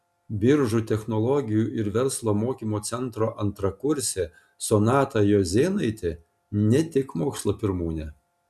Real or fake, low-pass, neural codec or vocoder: real; 14.4 kHz; none